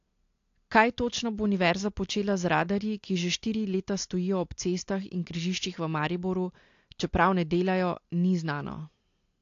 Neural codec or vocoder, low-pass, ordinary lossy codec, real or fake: none; 7.2 kHz; AAC, 48 kbps; real